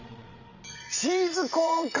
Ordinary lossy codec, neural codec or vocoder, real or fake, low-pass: none; vocoder, 22.05 kHz, 80 mel bands, Vocos; fake; 7.2 kHz